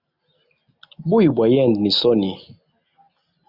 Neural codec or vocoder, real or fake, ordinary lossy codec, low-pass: none; real; Opus, 64 kbps; 5.4 kHz